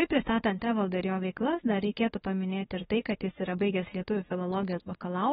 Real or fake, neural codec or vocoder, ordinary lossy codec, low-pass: fake; autoencoder, 48 kHz, 32 numbers a frame, DAC-VAE, trained on Japanese speech; AAC, 16 kbps; 19.8 kHz